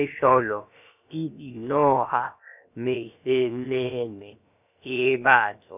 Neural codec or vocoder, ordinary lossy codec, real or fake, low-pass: codec, 16 kHz, about 1 kbps, DyCAST, with the encoder's durations; none; fake; 3.6 kHz